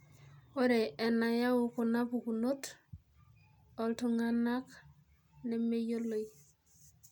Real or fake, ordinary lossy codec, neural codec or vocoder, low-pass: real; none; none; none